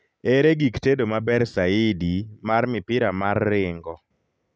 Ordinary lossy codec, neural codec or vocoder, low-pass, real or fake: none; none; none; real